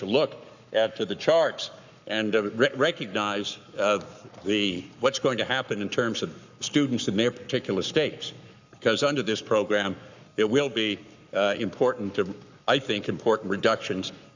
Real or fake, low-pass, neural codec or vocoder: fake; 7.2 kHz; codec, 44.1 kHz, 7.8 kbps, Pupu-Codec